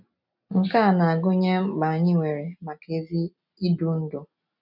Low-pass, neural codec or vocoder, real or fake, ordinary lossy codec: 5.4 kHz; none; real; none